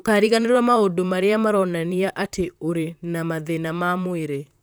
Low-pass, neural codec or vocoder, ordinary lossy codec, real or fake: none; vocoder, 44.1 kHz, 128 mel bands, Pupu-Vocoder; none; fake